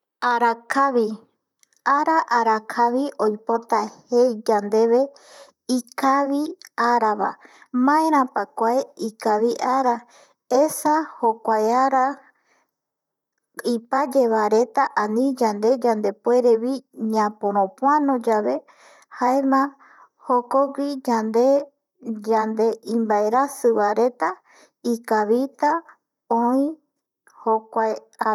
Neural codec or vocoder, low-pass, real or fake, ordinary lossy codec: vocoder, 44.1 kHz, 128 mel bands, Pupu-Vocoder; 19.8 kHz; fake; none